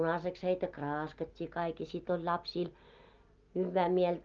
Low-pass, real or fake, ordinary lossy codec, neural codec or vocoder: 7.2 kHz; real; Opus, 32 kbps; none